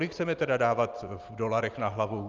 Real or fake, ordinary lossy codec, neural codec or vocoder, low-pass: real; Opus, 32 kbps; none; 7.2 kHz